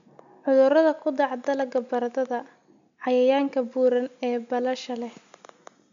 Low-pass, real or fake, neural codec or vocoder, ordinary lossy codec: 7.2 kHz; real; none; MP3, 64 kbps